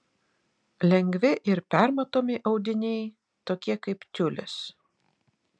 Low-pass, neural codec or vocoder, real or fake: 9.9 kHz; none; real